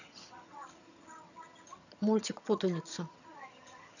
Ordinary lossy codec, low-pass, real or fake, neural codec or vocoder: none; 7.2 kHz; fake; vocoder, 22.05 kHz, 80 mel bands, HiFi-GAN